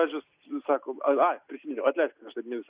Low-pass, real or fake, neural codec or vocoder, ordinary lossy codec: 3.6 kHz; real; none; Opus, 64 kbps